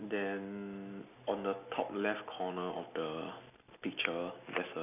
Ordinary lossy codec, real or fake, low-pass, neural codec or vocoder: none; real; 3.6 kHz; none